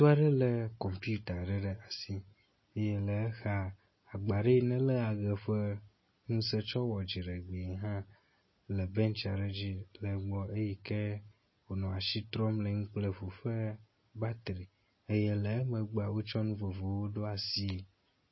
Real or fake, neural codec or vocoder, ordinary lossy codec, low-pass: real; none; MP3, 24 kbps; 7.2 kHz